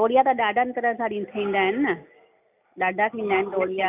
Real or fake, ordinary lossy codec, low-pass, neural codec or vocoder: real; none; 3.6 kHz; none